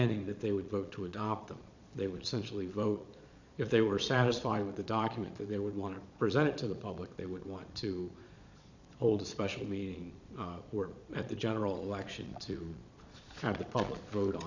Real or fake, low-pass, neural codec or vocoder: fake; 7.2 kHz; vocoder, 22.05 kHz, 80 mel bands, Vocos